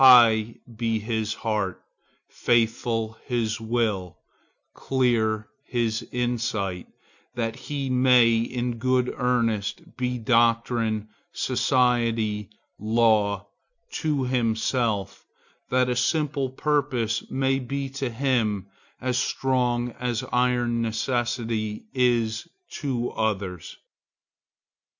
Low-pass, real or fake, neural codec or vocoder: 7.2 kHz; real; none